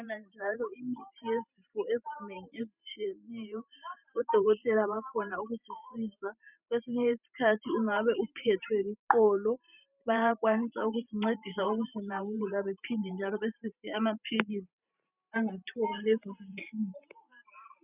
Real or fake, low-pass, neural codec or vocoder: fake; 3.6 kHz; vocoder, 44.1 kHz, 128 mel bands every 512 samples, BigVGAN v2